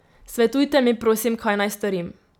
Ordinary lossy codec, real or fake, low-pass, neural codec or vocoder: none; real; 19.8 kHz; none